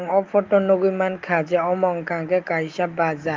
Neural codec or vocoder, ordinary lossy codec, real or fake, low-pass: none; Opus, 24 kbps; real; 7.2 kHz